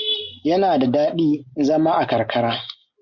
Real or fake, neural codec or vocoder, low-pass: real; none; 7.2 kHz